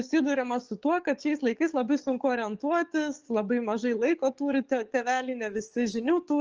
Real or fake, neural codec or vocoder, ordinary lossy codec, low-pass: fake; codec, 44.1 kHz, 7.8 kbps, DAC; Opus, 24 kbps; 7.2 kHz